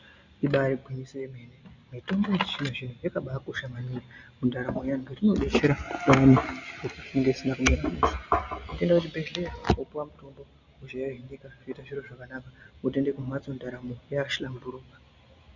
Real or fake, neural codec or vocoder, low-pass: real; none; 7.2 kHz